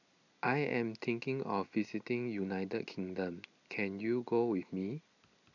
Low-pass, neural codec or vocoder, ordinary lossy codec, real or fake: 7.2 kHz; none; none; real